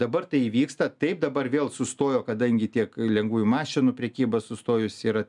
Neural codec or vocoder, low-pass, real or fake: none; 10.8 kHz; real